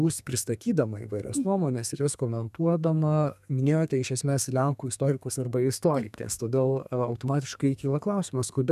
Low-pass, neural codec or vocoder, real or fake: 14.4 kHz; codec, 32 kHz, 1.9 kbps, SNAC; fake